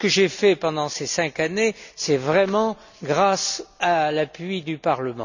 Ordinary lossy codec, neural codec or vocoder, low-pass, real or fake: none; none; 7.2 kHz; real